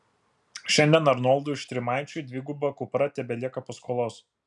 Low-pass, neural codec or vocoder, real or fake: 10.8 kHz; none; real